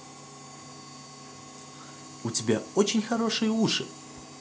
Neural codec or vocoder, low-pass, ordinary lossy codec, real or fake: none; none; none; real